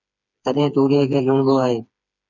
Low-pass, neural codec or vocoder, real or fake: 7.2 kHz; codec, 16 kHz, 4 kbps, FreqCodec, smaller model; fake